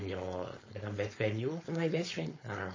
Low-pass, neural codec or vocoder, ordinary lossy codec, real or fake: 7.2 kHz; codec, 16 kHz, 4.8 kbps, FACodec; MP3, 32 kbps; fake